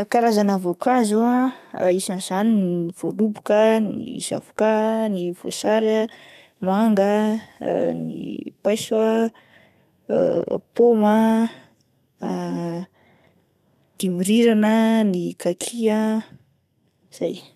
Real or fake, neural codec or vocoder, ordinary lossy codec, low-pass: fake; codec, 32 kHz, 1.9 kbps, SNAC; none; 14.4 kHz